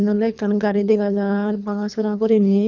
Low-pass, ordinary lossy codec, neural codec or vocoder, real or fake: 7.2 kHz; none; codec, 24 kHz, 3 kbps, HILCodec; fake